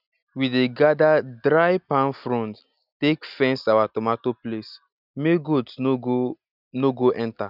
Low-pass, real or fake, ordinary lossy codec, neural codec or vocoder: 5.4 kHz; real; none; none